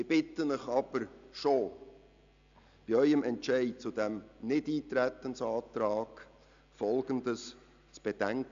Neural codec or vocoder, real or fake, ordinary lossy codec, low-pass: none; real; AAC, 64 kbps; 7.2 kHz